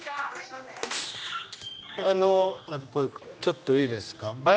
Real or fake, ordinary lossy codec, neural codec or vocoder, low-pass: fake; none; codec, 16 kHz, 1 kbps, X-Codec, HuBERT features, trained on general audio; none